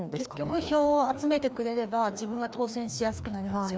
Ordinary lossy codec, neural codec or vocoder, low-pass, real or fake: none; codec, 16 kHz, 2 kbps, FreqCodec, larger model; none; fake